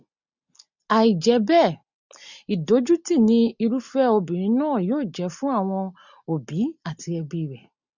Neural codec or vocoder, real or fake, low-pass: none; real; 7.2 kHz